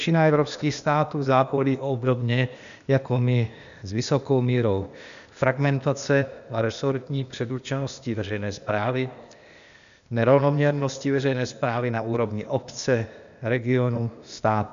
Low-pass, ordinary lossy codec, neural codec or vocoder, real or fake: 7.2 kHz; MP3, 96 kbps; codec, 16 kHz, 0.8 kbps, ZipCodec; fake